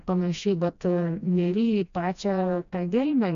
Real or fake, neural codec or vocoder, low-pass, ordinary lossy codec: fake; codec, 16 kHz, 1 kbps, FreqCodec, smaller model; 7.2 kHz; AAC, 96 kbps